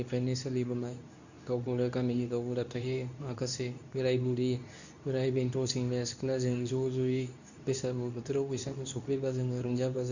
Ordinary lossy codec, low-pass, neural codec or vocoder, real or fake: none; 7.2 kHz; codec, 24 kHz, 0.9 kbps, WavTokenizer, medium speech release version 2; fake